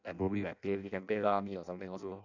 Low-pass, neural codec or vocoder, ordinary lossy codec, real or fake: 7.2 kHz; codec, 16 kHz in and 24 kHz out, 0.6 kbps, FireRedTTS-2 codec; none; fake